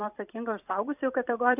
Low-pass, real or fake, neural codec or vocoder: 3.6 kHz; fake; vocoder, 44.1 kHz, 128 mel bands every 256 samples, BigVGAN v2